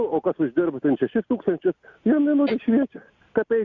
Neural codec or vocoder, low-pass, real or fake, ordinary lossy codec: none; 7.2 kHz; real; Opus, 64 kbps